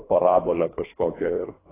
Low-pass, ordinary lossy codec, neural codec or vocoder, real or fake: 3.6 kHz; AAC, 16 kbps; codec, 24 kHz, 3 kbps, HILCodec; fake